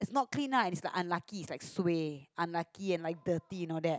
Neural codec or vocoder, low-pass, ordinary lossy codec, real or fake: none; none; none; real